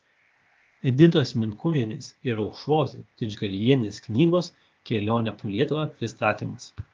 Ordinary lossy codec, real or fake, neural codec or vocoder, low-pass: Opus, 24 kbps; fake; codec, 16 kHz, 0.8 kbps, ZipCodec; 7.2 kHz